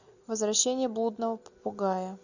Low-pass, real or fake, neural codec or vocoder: 7.2 kHz; real; none